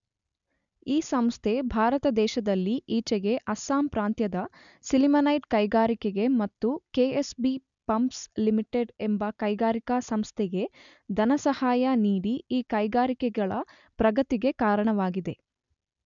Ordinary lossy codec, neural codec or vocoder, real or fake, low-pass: none; none; real; 7.2 kHz